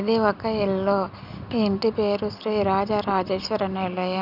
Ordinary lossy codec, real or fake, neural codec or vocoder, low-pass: none; real; none; 5.4 kHz